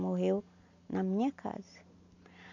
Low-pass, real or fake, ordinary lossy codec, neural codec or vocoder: 7.2 kHz; real; none; none